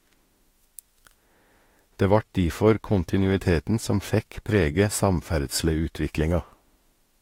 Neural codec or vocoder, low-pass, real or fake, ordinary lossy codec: autoencoder, 48 kHz, 32 numbers a frame, DAC-VAE, trained on Japanese speech; 19.8 kHz; fake; AAC, 48 kbps